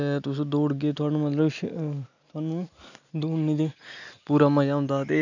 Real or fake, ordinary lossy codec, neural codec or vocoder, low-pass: real; none; none; 7.2 kHz